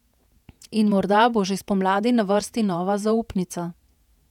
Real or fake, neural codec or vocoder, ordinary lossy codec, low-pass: fake; vocoder, 48 kHz, 128 mel bands, Vocos; none; 19.8 kHz